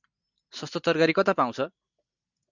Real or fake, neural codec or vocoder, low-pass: real; none; 7.2 kHz